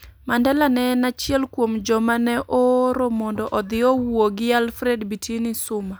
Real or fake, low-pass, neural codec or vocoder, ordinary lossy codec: real; none; none; none